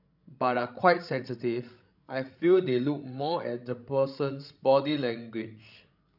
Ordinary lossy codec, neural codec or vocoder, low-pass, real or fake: none; codec, 16 kHz, 16 kbps, FreqCodec, larger model; 5.4 kHz; fake